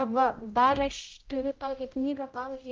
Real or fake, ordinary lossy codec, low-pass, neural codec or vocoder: fake; Opus, 24 kbps; 7.2 kHz; codec, 16 kHz, 0.5 kbps, X-Codec, HuBERT features, trained on general audio